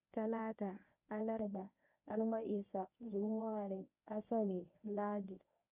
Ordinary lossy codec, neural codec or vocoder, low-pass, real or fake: none; codec, 24 kHz, 0.9 kbps, WavTokenizer, medium speech release version 1; 3.6 kHz; fake